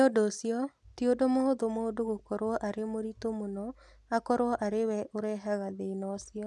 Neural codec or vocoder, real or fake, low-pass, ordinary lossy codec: none; real; none; none